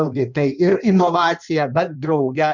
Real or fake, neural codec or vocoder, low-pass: fake; codec, 16 kHz in and 24 kHz out, 1.1 kbps, FireRedTTS-2 codec; 7.2 kHz